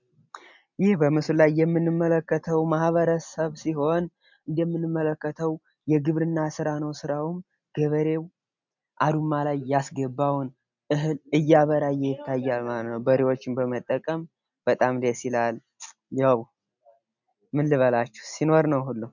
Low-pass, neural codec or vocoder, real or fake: 7.2 kHz; none; real